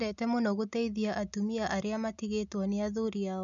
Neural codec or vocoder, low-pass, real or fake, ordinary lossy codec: none; 7.2 kHz; real; none